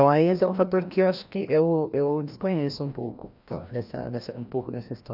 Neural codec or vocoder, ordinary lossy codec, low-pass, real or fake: codec, 16 kHz, 1 kbps, FreqCodec, larger model; none; 5.4 kHz; fake